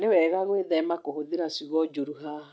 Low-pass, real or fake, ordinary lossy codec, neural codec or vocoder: none; real; none; none